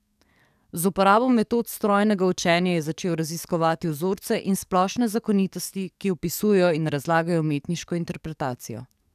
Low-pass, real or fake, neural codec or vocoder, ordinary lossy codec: 14.4 kHz; fake; codec, 44.1 kHz, 7.8 kbps, DAC; none